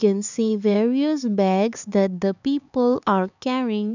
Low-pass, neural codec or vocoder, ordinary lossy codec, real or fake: 7.2 kHz; codec, 16 kHz, 4 kbps, X-Codec, HuBERT features, trained on LibriSpeech; none; fake